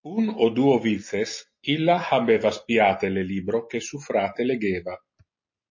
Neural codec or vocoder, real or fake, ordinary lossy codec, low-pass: none; real; MP3, 32 kbps; 7.2 kHz